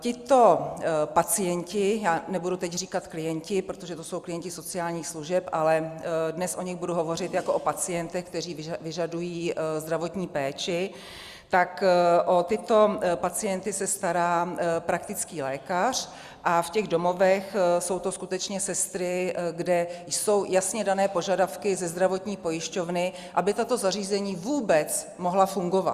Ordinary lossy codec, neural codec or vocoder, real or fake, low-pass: Opus, 64 kbps; none; real; 14.4 kHz